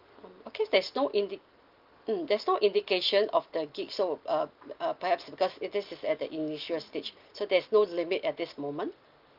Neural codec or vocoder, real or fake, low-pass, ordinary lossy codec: none; real; 5.4 kHz; Opus, 32 kbps